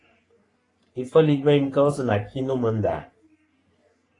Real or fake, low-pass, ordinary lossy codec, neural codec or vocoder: fake; 10.8 kHz; AAC, 32 kbps; codec, 44.1 kHz, 3.4 kbps, Pupu-Codec